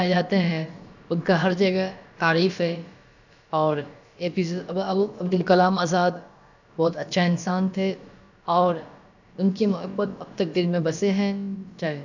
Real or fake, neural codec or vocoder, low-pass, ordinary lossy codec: fake; codec, 16 kHz, about 1 kbps, DyCAST, with the encoder's durations; 7.2 kHz; none